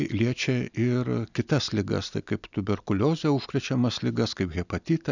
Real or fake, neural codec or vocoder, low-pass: real; none; 7.2 kHz